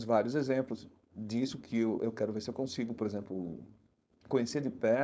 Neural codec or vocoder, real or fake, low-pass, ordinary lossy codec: codec, 16 kHz, 4.8 kbps, FACodec; fake; none; none